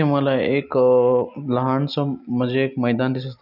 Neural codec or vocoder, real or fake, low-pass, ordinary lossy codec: none; real; 5.4 kHz; none